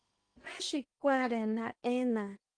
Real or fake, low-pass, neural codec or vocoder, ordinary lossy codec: fake; 9.9 kHz; codec, 16 kHz in and 24 kHz out, 0.8 kbps, FocalCodec, streaming, 65536 codes; Opus, 32 kbps